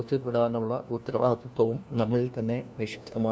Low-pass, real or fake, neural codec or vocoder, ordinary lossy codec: none; fake; codec, 16 kHz, 1 kbps, FunCodec, trained on LibriTTS, 50 frames a second; none